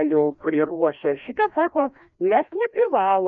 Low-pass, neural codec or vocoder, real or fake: 7.2 kHz; codec, 16 kHz, 1 kbps, FreqCodec, larger model; fake